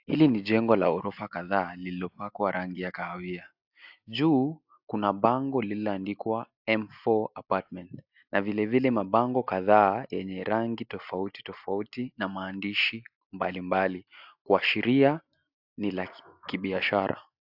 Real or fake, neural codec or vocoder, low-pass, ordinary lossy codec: real; none; 5.4 kHz; AAC, 48 kbps